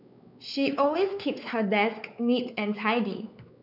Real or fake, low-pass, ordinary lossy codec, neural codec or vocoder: fake; 5.4 kHz; none; codec, 16 kHz, 4 kbps, X-Codec, WavLM features, trained on Multilingual LibriSpeech